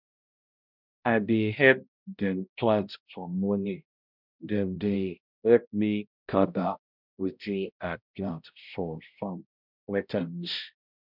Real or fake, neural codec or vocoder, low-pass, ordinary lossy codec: fake; codec, 16 kHz, 0.5 kbps, X-Codec, HuBERT features, trained on balanced general audio; 5.4 kHz; none